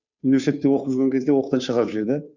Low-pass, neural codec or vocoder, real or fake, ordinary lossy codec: 7.2 kHz; codec, 16 kHz, 2 kbps, FunCodec, trained on Chinese and English, 25 frames a second; fake; none